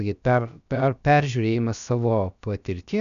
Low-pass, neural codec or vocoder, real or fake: 7.2 kHz; codec, 16 kHz, about 1 kbps, DyCAST, with the encoder's durations; fake